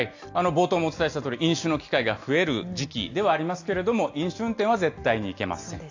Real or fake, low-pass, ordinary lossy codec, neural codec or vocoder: real; 7.2 kHz; AAC, 48 kbps; none